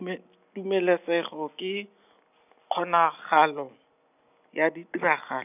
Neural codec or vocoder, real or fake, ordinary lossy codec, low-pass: none; real; none; 3.6 kHz